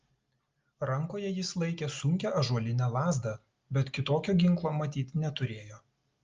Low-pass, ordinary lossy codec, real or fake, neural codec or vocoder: 7.2 kHz; Opus, 32 kbps; real; none